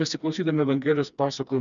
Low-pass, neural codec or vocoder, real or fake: 7.2 kHz; codec, 16 kHz, 2 kbps, FreqCodec, smaller model; fake